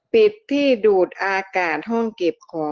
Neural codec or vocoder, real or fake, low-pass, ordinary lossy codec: none; real; 7.2 kHz; Opus, 16 kbps